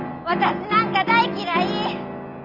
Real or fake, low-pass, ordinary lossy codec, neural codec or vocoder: real; 5.4 kHz; Opus, 64 kbps; none